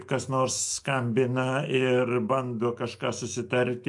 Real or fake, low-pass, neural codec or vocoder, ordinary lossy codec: fake; 10.8 kHz; autoencoder, 48 kHz, 128 numbers a frame, DAC-VAE, trained on Japanese speech; MP3, 64 kbps